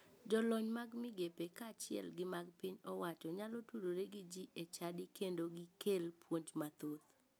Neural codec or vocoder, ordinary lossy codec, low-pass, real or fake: none; none; none; real